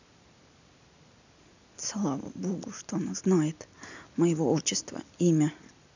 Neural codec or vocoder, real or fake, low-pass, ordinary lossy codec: none; real; 7.2 kHz; none